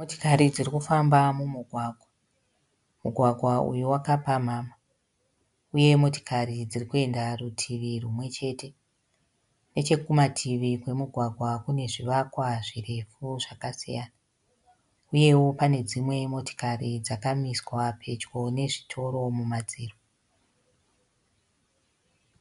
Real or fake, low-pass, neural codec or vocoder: real; 10.8 kHz; none